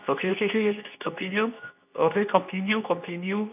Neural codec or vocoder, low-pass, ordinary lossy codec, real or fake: codec, 24 kHz, 0.9 kbps, WavTokenizer, medium speech release version 2; 3.6 kHz; none; fake